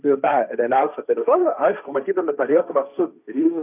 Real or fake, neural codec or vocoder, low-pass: fake; codec, 16 kHz, 1.1 kbps, Voila-Tokenizer; 3.6 kHz